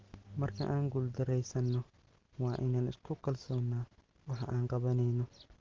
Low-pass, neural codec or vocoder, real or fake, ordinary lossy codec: 7.2 kHz; none; real; Opus, 16 kbps